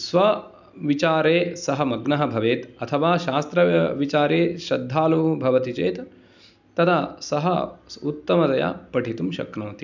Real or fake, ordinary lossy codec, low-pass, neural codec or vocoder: real; none; 7.2 kHz; none